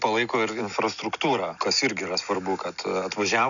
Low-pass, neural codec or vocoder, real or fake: 7.2 kHz; none; real